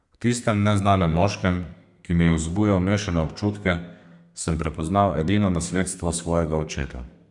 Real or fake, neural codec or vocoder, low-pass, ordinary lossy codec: fake; codec, 32 kHz, 1.9 kbps, SNAC; 10.8 kHz; none